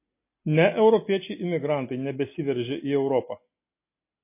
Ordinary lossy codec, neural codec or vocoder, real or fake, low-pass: MP3, 24 kbps; none; real; 3.6 kHz